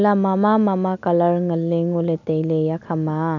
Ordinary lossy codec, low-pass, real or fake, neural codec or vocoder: none; 7.2 kHz; real; none